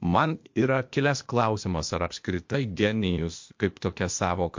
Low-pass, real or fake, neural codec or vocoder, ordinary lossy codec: 7.2 kHz; fake; codec, 16 kHz, 0.8 kbps, ZipCodec; MP3, 48 kbps